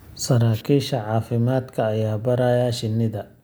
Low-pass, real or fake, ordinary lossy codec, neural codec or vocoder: none; real; none; none